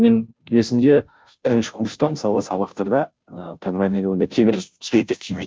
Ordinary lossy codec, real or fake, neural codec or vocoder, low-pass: none; fake; codec, 16 kHz, 0.5 kbps, FunCodec, trained on Chinese and English, 25 frames a second; none